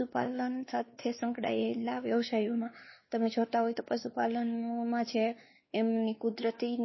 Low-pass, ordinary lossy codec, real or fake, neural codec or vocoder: 7.2 kHz; MP3, 24 kbps; fake; codec, 16 kHz, 2 kbps, X-Codec, WavLM features, trained on Multilingual LibriSpeech